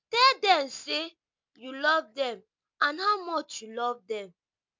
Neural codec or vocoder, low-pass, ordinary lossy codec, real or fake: none; 7.2 kHz; MP3, 64 kbps; real